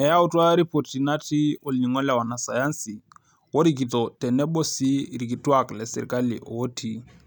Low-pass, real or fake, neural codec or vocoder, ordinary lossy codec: 19.8 kHz; real; none; none